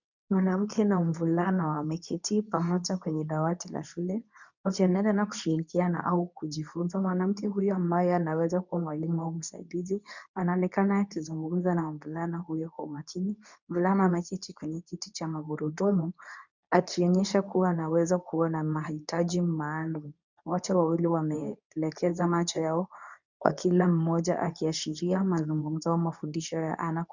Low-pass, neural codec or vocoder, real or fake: 7.2 kHz; codec, 24 kHz, 0.9 kbps, WavTokenizer, medium speech release version 2; fake